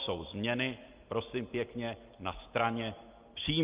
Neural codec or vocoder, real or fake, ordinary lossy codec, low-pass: none; real; Opus, 32 kbps; 3.6 kHz